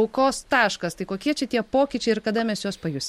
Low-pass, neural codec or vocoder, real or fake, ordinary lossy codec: 19.8 kHz; none; real; MP3, 64 kbps